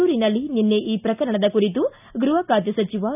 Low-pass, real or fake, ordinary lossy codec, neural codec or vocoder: 3.6 kHz; real; none; none